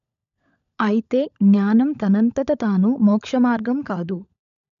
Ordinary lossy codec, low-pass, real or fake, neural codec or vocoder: none; 7.2 kHz; fake; codec, 16 kHz, 16 kbps, FunCodec, trained on LibriTTS, 50 frames a second